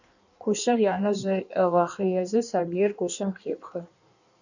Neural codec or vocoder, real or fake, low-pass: codec, 16 kHz in and 24 kHz out, 1.1 kbps, FireRedTTS-2 codec; fake; 7.2 kHz